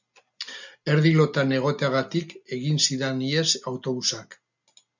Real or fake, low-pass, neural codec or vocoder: real; 7.2 kHz; none